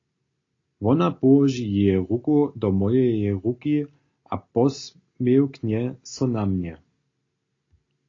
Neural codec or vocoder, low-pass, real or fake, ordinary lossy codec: none; 7.2 kHz; real; AAC, 32 kbps